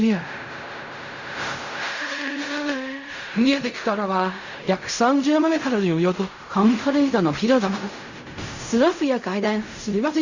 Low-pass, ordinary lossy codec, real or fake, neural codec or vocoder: 7.2 kHz; Opus, 64 kbps; fake; codec, 16 kHz in and 24 kHz out, 0.4 kbps, LongCat-Audio-Codec, fine tuned four codebook decoder